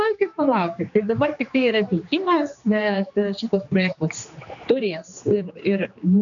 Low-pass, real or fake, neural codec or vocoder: 7.2 kHz; fake; codec, 16 kHz, 2 kbps, X-Codec, HuBERT features, trained on general audio